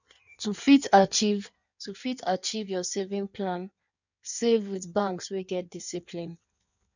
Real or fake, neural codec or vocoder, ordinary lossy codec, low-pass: fake; codec, 16 kHz in and 24 kHz out, 1.1 kbps, FireRedTTS-2 codec; none; 7.2 kHz